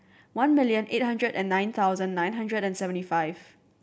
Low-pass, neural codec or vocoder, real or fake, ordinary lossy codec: none; none; real; none